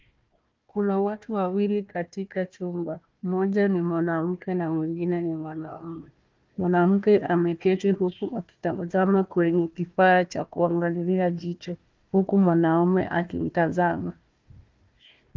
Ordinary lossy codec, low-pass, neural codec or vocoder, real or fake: Opus, 32 kbps; 7.2 kHz; codec, 16 kHz, 1 kbps, FunCodec, trained on Chinese and English, 50 frames a second; fake